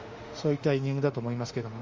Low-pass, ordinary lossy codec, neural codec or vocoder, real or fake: 7.2 kHz; Opus, 32 kbps; autoencoder, 48 kHz, 32 numbers a frame, DAC-VAE, trained on Japanese speech; fake